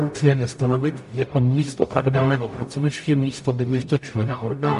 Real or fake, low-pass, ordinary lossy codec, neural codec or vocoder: fake; 14.4 kHz; MP3, 48 kbps; codec, 44.1 kHz, 0.9 kbps, DAC